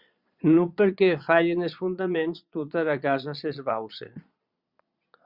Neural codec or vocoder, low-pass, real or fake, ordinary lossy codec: vocoder, 22.05 kHz, 80 mel bands, Vocos; 5.4 kHz; fake; Opus, 64 kbps